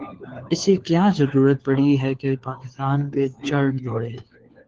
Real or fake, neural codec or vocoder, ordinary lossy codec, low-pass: fake; codec, 16 kHz, 4 kbps, FunCodec, trained on LibriTTS, 50 frames a second; Opus, 16 kbps; 7.2 kHz